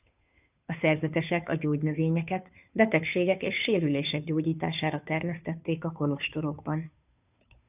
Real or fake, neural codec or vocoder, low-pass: fake; codec, 16 kHz, 2 kbps, FunCodec, trained on Chinese and English, 25 frames a second; 3.6 kHz